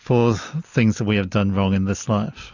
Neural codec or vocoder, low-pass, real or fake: none; 7.2 kHz; real